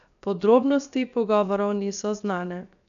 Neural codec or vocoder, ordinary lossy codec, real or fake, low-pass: codec, 16 kHz, 0.7 kbps, FocalCodec; none; fake; 7.2 kHz